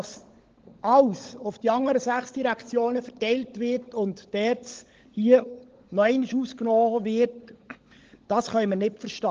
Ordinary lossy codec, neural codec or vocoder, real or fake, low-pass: Opus, 16 kbps; codec, 16 kHz, 16 kbps, FunCodec, trained on LibriTTS, 50 frames a second; fake; 7.2 kHz